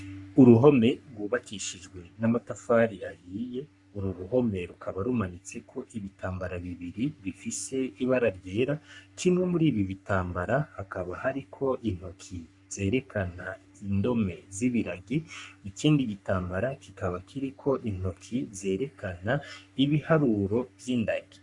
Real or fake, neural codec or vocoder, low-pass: fake; codec, 44.1 kHz, 3.4 kbps, Pupu-Codec; 10.8 kHz